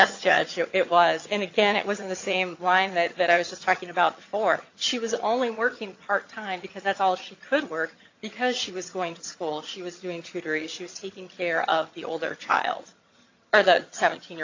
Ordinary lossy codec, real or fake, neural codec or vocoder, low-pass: AAC, 48 kbps; fake; vocoder, 22.05 kHz, 80 mel bands, HiFi-GAN; 7.2 kHz